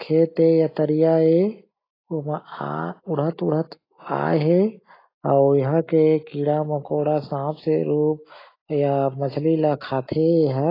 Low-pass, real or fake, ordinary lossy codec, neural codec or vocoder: 5.4 kHz; real; AAC, 24 kbps; none